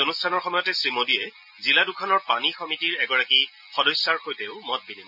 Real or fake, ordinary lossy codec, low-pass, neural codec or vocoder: real; none; 5.4 kHz; none